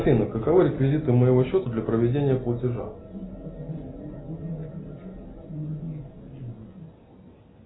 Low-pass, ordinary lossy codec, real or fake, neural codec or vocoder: 7.2 kHz; AAC, 16 kbps; real; none